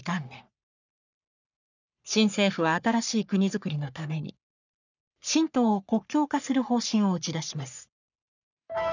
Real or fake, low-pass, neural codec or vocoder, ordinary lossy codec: fake; 7.2 kHz; codec, 44.1 kHz, 3.4 kbps, Pupu-Codec; none